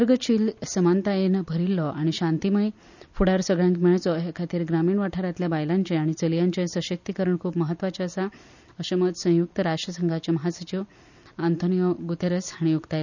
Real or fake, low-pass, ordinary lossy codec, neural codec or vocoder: real; 7.2 kHz; none; none